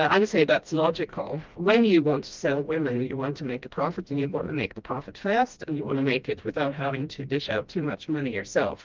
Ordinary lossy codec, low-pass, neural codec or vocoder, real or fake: Opus, 24 kbps; 7.2 kHz; codec, 16 kHz, 1 kbps, FreqCodec, smaller model; fake